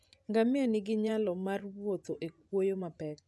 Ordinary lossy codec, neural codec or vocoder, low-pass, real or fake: none; vocoder, 24 kHz, 100 mel bands, Vocos; none; fake